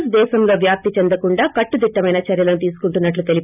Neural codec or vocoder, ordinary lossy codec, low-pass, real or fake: none; none; 3.6 kHz; real